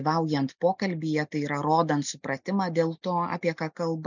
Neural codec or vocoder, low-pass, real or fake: none; 7.2 kHz; real